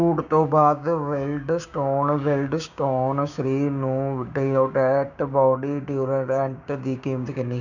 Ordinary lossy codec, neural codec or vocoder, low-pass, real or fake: none; codec, 16 kHz, 6 kbps, DAC; 7.2 kHz; fake